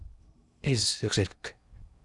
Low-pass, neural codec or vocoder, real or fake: 10.8 kHz; codec, 16 kHz in and 24 kHz out, 0.6 kbps, FocalCodec, streaming, 2048 codes; fake